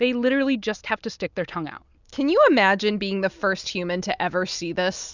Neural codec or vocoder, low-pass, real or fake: none; 7.2 kHz; real